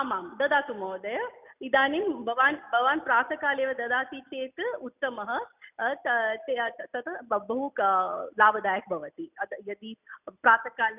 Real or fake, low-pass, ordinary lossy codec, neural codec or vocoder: real; 3.6 kHz; none; none